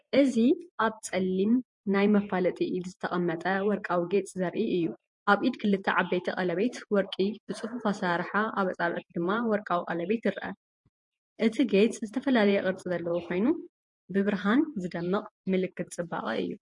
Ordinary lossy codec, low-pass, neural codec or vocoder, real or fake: MP3, 48 kbps; 10.8 kHz; vocoder, 48 kHz, 128 mel bands, Vocos; fake